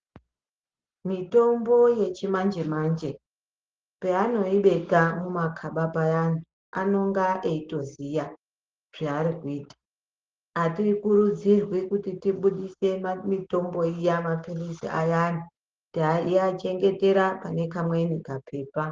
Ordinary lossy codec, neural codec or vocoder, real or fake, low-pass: Opus, 16 kbps; none; real; 7.2 kHz